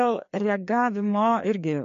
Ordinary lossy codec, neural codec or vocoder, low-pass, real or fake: MP3, 48 kbps; codec, 16 kHz, 2 kbps, FreqCodec, larger model; 7.2 kHz; fake